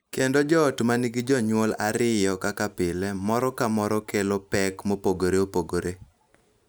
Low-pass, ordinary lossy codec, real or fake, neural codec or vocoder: none; none; real; none